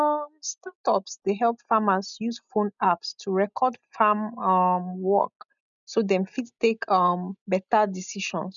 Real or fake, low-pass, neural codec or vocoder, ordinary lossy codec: real; 7.2 kHz; none; none